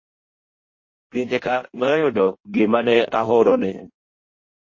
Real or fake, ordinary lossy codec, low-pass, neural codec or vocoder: fake; MP3, 32 kbps; 7.2 kHz; codec, 16 kHz in and 24 kHz out, 0.6 kbps, FireRedTTS-2 codec